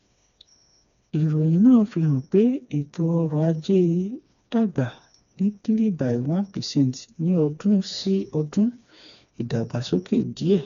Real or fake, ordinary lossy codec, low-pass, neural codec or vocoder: fake; none; 7.2 kHz; codec, 16 kHz, 2 kbps, FreqCodec, smaller model